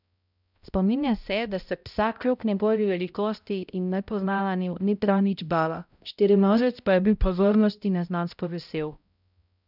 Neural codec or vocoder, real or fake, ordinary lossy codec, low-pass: codec, 16 kHz, 0.5 kbps, X-Codec, HuBERT features, trained on balanced general audio; fake; none; 5.4 kHz